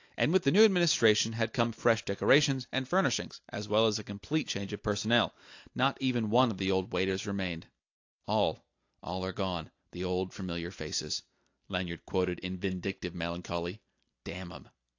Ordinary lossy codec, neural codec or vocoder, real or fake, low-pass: AAC, 48 kbps; none; real; 7.2 kHz